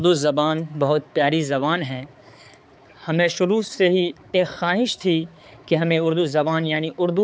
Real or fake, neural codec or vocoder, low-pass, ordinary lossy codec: fake; codec, 16 kHz, 4 kbps, X-Codec, HuBERT features, trained on balanced general audio; none; none